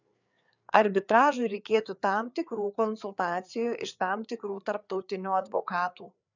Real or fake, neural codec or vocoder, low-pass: fake; codec, 16 kHz, 4 kbps, FreqCodec, larger model; 7.2 kHz